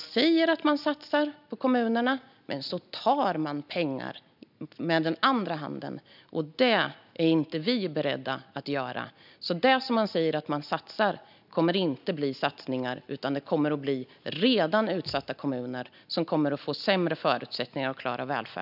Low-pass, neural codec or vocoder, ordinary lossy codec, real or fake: 5.4 kHz; none; none; real